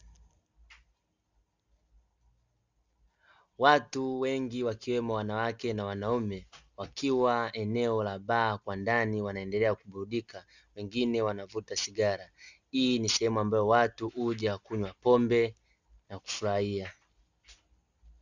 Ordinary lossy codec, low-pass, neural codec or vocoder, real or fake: Opus, 64 kbps; 7.2 kHz; none; real